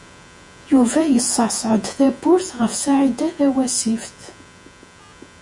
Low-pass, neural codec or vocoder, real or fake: 10.8 kHz; vocoder, 48 kHz, 128 mel bands, Vocos; fake